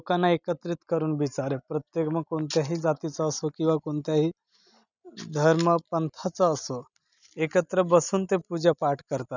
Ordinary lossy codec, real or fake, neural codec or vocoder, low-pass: none; real; none; 7.2 kHz